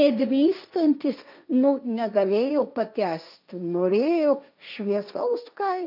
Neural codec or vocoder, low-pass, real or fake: codec, 16 kHz, 1.1 kbps, Voila-Tokenizer; 5.4 kHz; fake